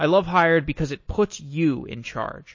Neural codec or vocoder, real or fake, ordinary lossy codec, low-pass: none; real; MP3, 32 kbps; 7.2 kHz